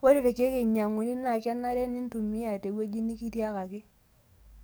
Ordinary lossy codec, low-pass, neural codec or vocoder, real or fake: none; none; codec, 44.1 kHz, 7.8 kbps, DAC; fake